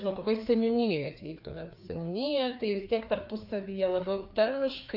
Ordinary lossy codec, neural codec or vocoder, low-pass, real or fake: AAC, 48 kbps; codec, 16 kHz, 2 kbps, FreqCodec, larger model; 5.4 kHz; fake